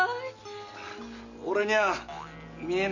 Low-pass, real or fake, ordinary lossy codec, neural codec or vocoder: 7.2 kHz; real; none; none